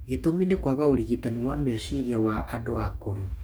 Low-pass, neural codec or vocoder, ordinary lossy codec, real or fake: none; codec, 44.1 kHz, 2.6 kbps, DAC; none; fake